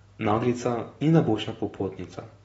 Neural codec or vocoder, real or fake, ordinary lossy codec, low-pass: none; real; AAC, 24 kbps; 10.8 kHz